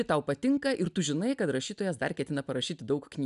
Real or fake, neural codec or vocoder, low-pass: real; none; 10.8 kHz